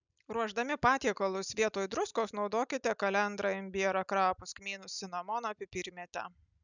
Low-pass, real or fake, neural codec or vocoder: 7.2 kHz; real; none